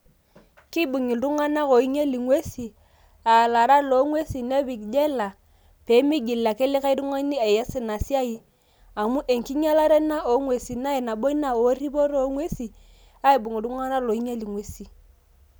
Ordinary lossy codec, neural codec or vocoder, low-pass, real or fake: none; none; none; real